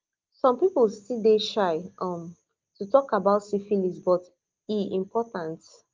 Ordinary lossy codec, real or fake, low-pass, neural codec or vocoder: Opus, 32 kbps; real; 7.2 kHz; none